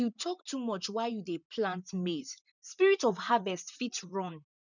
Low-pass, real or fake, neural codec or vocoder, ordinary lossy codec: 7.2 kHz; fake; vocoder, 44.1 kHz, 128 mel bands, Pupu-Vocoder; none